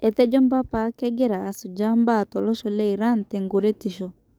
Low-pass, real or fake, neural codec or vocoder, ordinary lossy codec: none; fake; codec, 44.1 kHz, 7.8 kbps, DAC; none